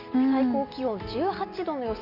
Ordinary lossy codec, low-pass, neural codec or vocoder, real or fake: none; 5.4 kHz; none; real